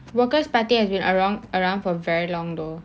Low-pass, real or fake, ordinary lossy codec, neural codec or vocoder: none; real; none; none